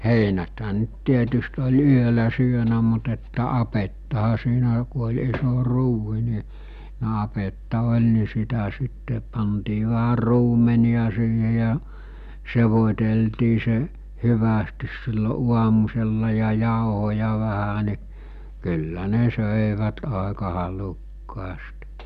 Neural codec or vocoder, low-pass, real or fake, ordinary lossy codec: none; 14.4 kHz; real; none